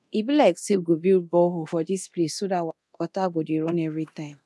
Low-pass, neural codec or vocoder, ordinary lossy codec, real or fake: none; codec, 24 kHz, 0.9 kbps, DualCodec; none; fake